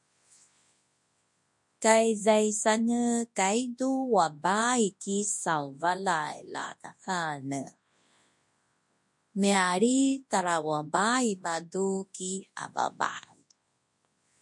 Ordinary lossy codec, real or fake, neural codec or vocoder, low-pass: MP3, 48 kbps; fake; codec, 24 kHz, 0.9 kbps, WavTokenizer, large speech release; 10.8 kHz